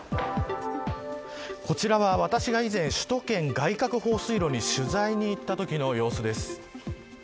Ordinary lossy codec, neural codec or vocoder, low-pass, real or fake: none; none; none; real